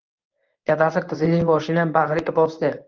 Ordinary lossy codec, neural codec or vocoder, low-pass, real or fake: Opus, 24 kbps; codec, 24 kHz, 0.9 kbps, WavTokenizer, medium speech release version 1; 7.2 kHz; fake